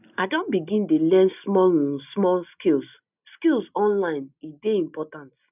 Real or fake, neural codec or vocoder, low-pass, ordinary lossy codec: real; none; 3.6 kHz; none